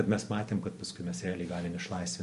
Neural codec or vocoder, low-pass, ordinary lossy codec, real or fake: none; 14.4 kHz; MP3, 48 kbps; real